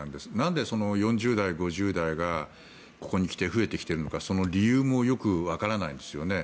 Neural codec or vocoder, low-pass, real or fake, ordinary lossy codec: none; none; real; none